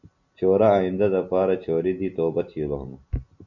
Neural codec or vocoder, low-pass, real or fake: none; 7.2 kHz; real